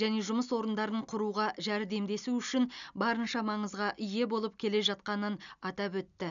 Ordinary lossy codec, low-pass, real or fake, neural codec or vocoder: none; 7.2 kHz; real; none